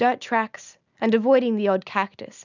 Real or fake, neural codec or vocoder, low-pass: real; none; 7.2 kHz